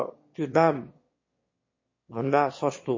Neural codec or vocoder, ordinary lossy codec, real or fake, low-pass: autoencoder, 22.05 kHz, a latent of 192 numbers a frame, VITS, trained on one speaker; MP3, 32 kbps; fake; 7.2 kHz